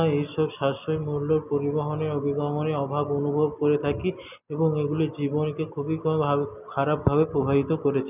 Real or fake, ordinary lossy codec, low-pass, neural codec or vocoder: real; none; 3.6 kHz; none